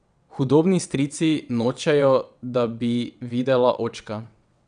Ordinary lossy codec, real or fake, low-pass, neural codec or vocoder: none; fake; 9.9 kHz; vocoder, 22.05 kHz, 80 mel bands, WaveNeXt